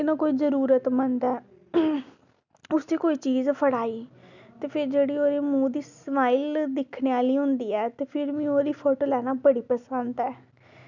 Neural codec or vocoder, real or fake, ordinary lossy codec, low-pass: none; real; none; 7.2 kHz